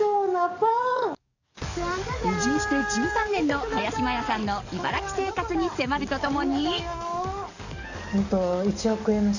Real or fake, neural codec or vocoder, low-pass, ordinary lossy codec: fake; codec, 44.1 kHz, 7.8 kbps, DAC; 7.2 kHz; none